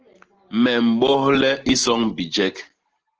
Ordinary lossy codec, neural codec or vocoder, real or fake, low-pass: Opus, 16 kbps; none; real; 7.2 kHz